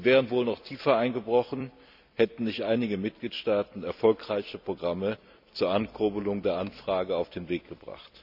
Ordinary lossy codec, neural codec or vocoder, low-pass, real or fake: none; vocoder, 44.1 kHz, 128 mel bands every 256 samples, BigVGAN v2; 5.4 kHz; fake